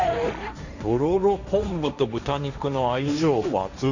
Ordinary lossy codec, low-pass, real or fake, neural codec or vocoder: none; 7.2 kHz; fake; codec, 16 kHz, 1.1 kbps, Voila-Tokenizer